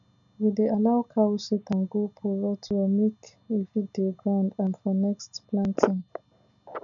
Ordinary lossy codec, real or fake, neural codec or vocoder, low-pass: none; real; none; 7.2 kHz